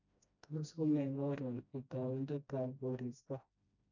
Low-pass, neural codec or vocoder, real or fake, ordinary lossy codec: 7.2 kHz; codec, 16 kHz, 1 kbps, FreqCodec, smaller model; fake; none